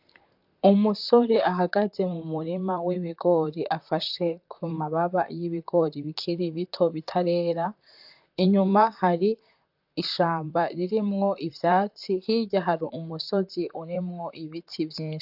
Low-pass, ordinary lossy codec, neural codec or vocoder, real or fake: 5.4 kHz; AAC, 48 kbps; vocoder, 44.1 kHz, 128 mel bands, Pupu-Vocoder; fake